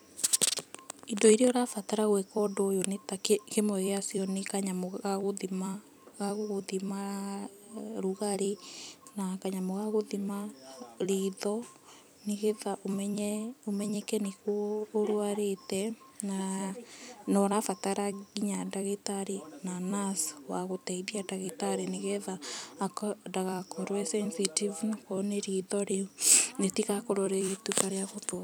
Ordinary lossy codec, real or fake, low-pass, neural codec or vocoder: none; fake; none; vocoder, 44.1 kHz, 128 mel bands every 256 samples, BigVGAN v2